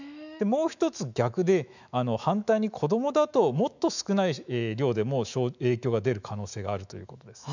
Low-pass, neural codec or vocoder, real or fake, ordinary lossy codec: 7.2 kHz; autoencoder, 48 kHz, 128 numbers a frame, DAC-VAE, trained on Japanese speech; fake; none